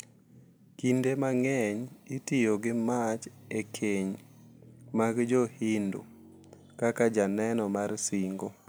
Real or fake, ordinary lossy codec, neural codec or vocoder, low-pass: real; none; none; none